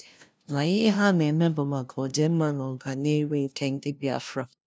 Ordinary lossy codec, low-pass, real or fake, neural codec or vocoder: none; none; fake; codec, 16 kHz, 0.5 kbps, FunCodec, trained on LibriTTS, 25 frames a second